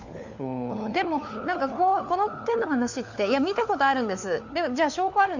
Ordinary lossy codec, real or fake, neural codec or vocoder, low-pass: none; fake; codec, 16 kHz, 4 kbps, FunCodec, trained on LibriTTS, 50 frames a second; 7.2 kHz